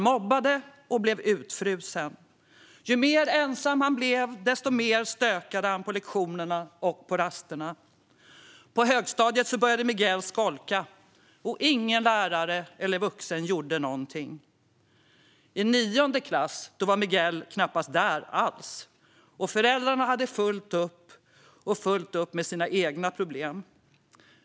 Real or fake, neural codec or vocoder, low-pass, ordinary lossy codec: real; none; none; none